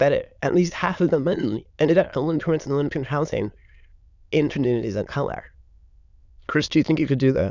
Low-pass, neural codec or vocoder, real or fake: 7.2 kHz; autoencoder, 22.05 kHz, a latent of 192 numbers a frame, VITS, trained on many speakers; fake